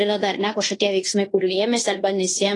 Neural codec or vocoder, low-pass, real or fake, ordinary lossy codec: codec, 16 kHz in and 24 kHz out, 0.9 kbps, LongCat-Audio-Codec, fine tuned four codebook decoder; 10.8 kHz; fake; AAC, 32 kbps